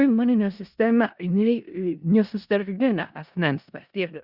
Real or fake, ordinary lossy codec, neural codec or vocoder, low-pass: fake; Opus, 64 kbps; codec, 16 kHz in and 24 kHz out, 0.4 kbps, LongCat-Audio-Codec, four codebook decoder; 5.4 kHz